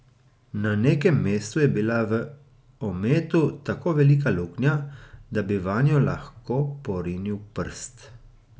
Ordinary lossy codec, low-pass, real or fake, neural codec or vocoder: none; none; real; none